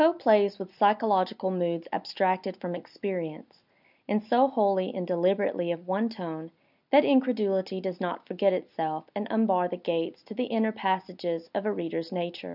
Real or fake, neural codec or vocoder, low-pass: real; none; 5.4 kHz